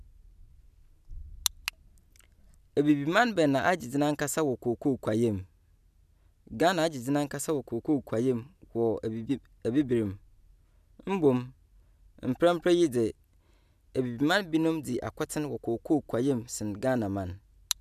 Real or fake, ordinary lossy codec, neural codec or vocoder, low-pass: real; none; none; 14.4 kHz